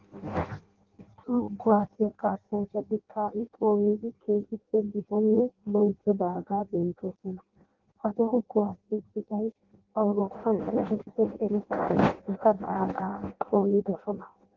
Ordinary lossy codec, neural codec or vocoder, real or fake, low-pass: Opus, 16 kbps; codec, 16 kHz in and 24 kHz out, 0.6 kbps, FireRedTTS-2 codec; fake; 7.2 kHz